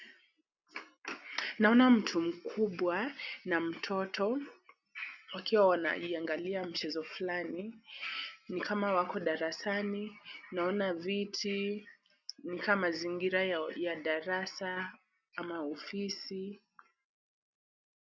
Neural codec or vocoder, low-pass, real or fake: none; 7.2 kHz; real